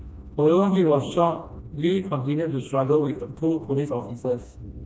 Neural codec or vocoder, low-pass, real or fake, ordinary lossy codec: codec, 16 kHz, 1 kbps, FreqCodec, smaller model; none; fake; none